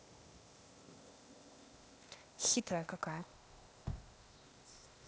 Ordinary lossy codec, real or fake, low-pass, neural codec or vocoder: none; fake; none; codec, 16 kHz, 0.8 kbps, ZipCodec